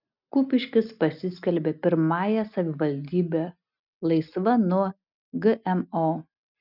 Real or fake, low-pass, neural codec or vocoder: real; 5.4 kHz; none